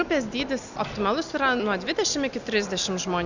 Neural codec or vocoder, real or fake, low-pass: none; real; 7.2 kHz